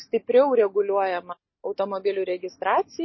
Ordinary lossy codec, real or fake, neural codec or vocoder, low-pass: MP3, 24 kbps; real; none; 7.2 kHz